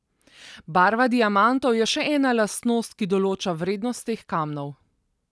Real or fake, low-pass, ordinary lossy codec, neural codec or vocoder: real; none; none; none